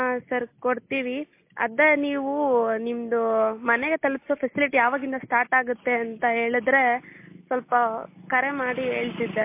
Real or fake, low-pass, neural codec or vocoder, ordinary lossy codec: real; 3.6 kHz; none; MP3, 24 kbps